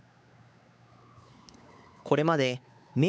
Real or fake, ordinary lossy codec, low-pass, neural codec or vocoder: fake; none; none; codec, 16 kHz, 4 kbps, X-Codec, WavLM features, trained on Multilingual LibriSpeech